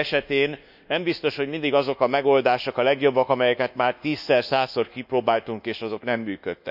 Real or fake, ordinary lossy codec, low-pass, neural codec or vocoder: fake; none; 5.4 kHz; codec, 24 kHz, 1.2 kbps, DualCodec